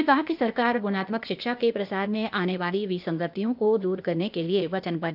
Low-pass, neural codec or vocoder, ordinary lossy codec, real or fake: 5.4 kHz; codec, 16 kHz, 0.8 kbps, ZipCodec; none; fake